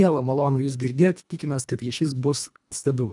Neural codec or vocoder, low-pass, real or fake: codec, 24 kHz, 1.5 kbps, HILCodec; 10.8 kHz; fake